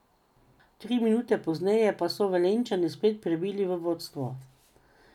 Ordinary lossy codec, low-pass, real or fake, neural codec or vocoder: none; 19.8 kHz; real; none